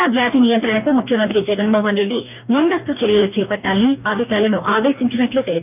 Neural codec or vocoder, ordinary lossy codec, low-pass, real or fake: codec, 44.1 kHz, 2.6 kbps, DAC; none; 3.6 kHz; fake